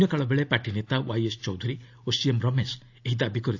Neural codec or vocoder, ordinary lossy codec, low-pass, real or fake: none; AAC, 48 kbps; 7.2 kHz; real